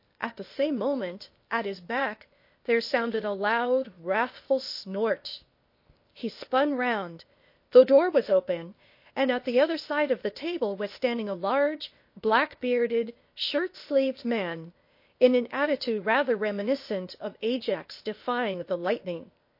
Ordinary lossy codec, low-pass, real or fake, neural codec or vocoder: MP3, 32 kbps; 5.4 kHz; fake; codec, 16 kHz, 0.8 kbps, ZipCodec